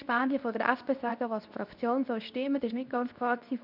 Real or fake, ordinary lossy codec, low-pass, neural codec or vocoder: fake; none; 5.4 kHz; codec, 24 kHz, 0.9 kbps, WavTokenizer, medium speech release version 2